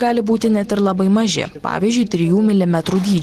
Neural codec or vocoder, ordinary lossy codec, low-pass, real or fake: none; Opus, 16 kbps; 14.4 kHz; real